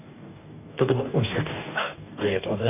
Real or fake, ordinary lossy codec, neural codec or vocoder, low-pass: fake; none; codec, 44.1 kHz, 2.6 kbps, DAC; 3.6 kHz